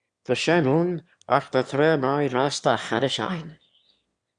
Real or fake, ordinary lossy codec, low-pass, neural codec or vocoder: fake; Opus, 64 kbps; 9.9 kHz; autoencoder, 22.05 kHz, a latent of 192 numbers a frame, VITS, trained on one speaker